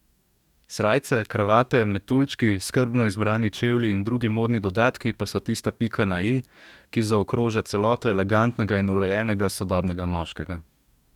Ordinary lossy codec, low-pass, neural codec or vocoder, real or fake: none; 19.8 kHz; codec, 44.1 kHz, 2.6 kbps, DAC; fake